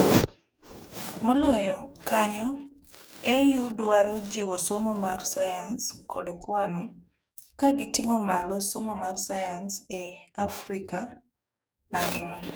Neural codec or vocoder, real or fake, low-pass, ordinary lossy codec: codec, 44.1 kHz, 2.6 kbps, DAC; fake; none; none